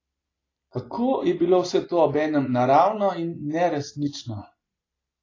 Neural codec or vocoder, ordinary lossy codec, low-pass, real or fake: none; AAC, 32 kbps; 7.2 kHz; real